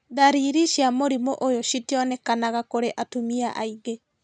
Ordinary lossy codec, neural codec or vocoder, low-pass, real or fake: none; none; 9.9 kHz; real